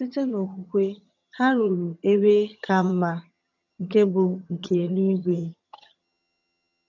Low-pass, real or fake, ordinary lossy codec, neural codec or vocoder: 7.2 kHz; fake; none; vocoder, 22.05 kHz, 80 mel bands, HiFi-GAN